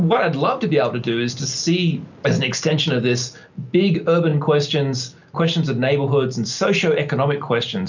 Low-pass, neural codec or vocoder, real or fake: 7.2 kHz; none; real